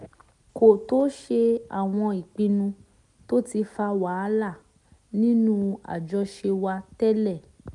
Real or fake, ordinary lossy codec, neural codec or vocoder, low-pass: real; none; none; 10.8 kHz